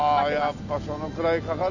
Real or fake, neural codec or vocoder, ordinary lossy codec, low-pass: real; none; none; 7.2 kHz